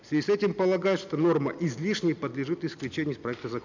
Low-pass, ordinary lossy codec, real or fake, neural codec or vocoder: 7.2 kHz; none; real; none